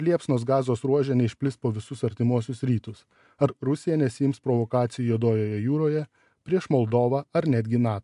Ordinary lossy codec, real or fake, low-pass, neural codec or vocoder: AAC, 96 kbps; real; 10.8 kHz; none